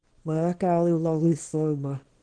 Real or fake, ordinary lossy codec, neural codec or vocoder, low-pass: fake; Opus, 16 kbps; codec, 24 kHz, 0.9 kbps, WavTokenizer, small release; 9.9 kHz